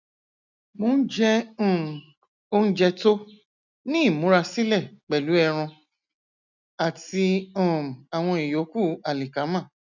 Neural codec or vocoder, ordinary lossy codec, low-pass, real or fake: none; none; 7.2 kHz; real